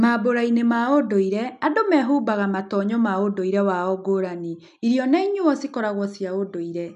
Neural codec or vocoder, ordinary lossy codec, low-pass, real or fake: none; none; 10.8 kHz; real